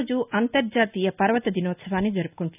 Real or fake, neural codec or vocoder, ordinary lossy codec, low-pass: real; none; none; 3.6 kHz